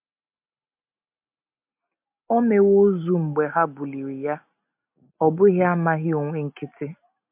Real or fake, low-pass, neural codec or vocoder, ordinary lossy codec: real; 3.6 kHz; none; MP3, 32 kbps